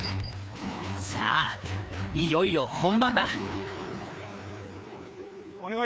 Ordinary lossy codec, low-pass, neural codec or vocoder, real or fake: none; none; codec, 16 kHz, 2 kbps, FreqCodec, larger model; fake